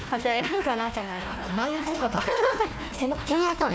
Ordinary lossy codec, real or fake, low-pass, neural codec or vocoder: none; fake; none; codec, 16 kHz, 1 kbps, FunCodec, trained on Chinese and English, 50 frames a second